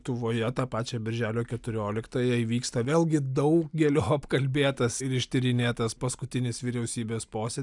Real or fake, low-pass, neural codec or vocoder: real; 10.8 kHz; none